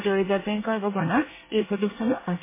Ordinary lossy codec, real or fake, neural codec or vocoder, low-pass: MP3, 16 kbps; fake; codec, 24 kHz, 1 kbps, SNAC; 3.6 kHz